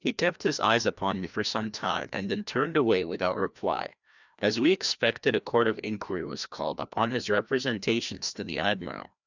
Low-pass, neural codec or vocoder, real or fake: 7.2 kHz; codec, 16 kHz, 1 kbps, FreqCodec, larger model; fake